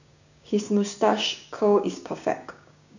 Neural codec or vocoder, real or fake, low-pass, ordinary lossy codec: codec, 16 kHz, 6 kbps, DAC; fake; 7.2 kHz; none